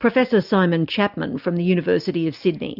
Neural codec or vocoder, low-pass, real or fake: none; 5.4 kHz; real